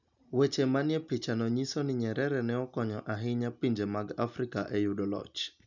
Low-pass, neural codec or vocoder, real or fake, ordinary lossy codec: 7.2 kHz; none; real; none